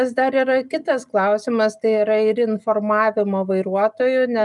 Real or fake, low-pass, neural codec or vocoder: real; 10.8 kHz; none